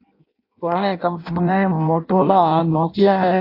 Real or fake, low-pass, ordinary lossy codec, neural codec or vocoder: fake; 5.4 kHz; AAC, 32 kbps; codec, 16 kHz in and 24 kHz out, 0.6 kbps, FireRedTTS-2 codec